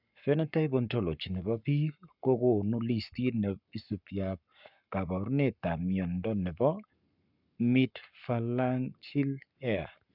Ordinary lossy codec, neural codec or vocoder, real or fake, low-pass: none; codec, 44.1 kHz, 7.8 kbps, Pupu-Codec; fake; 5.4 kHz